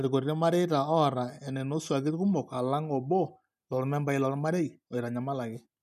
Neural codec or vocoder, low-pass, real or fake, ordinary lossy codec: none; 14.4 kHz; real; none